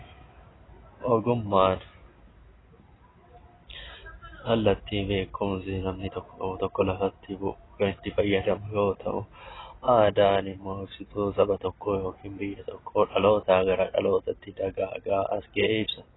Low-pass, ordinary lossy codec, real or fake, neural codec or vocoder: 7.2 kHz; AAC, 16 kbps; real; none